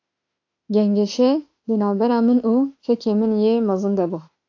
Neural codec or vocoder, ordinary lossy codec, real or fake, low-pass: autoencoder, 48 kHz, 32 numbers a frame, DAC-VAE, trained on Japanese speech; AAC, 48 kbps; fake; 7.2 kHz